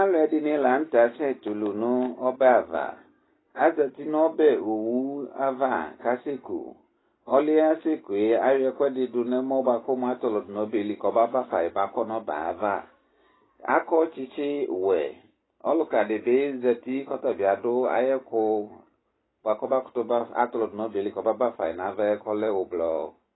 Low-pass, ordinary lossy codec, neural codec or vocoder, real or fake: 7.2 kHz; AAC, 16 kbps; none; real